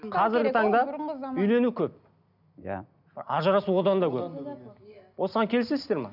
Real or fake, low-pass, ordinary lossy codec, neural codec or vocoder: real; 5.4 kHz; none; none